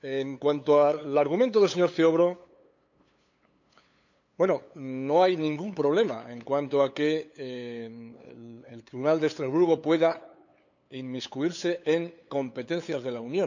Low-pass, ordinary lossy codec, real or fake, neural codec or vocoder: 7.2 kHz; none; fake; codec, 16 kHz, 8 kbps, FunCodec, trained on LibriTTS, 25 frames a second